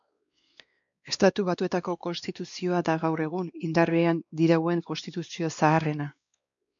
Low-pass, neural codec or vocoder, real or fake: 7.2 kHz; codec, 16 kHz, 2 kbps, X-Codec, WavLM features, trained on Multilingual LibriSpeech; fake